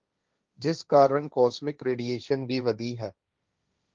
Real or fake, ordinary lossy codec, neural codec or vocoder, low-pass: fake; Opus, 32 kbps; codec, 16 kHz, 1.1 kbps, Voila-Tokenizer; 7.2 kHz